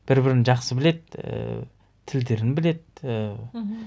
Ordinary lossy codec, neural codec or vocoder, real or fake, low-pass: none; none; real; none